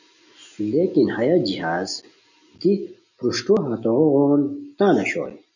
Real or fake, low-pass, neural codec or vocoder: real; 7.2 kHz; none